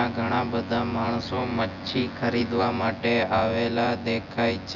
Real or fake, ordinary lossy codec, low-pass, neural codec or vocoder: fake; none; 7.2 kHz; vocoder, 24 kHz, 100 mel bands, Vocos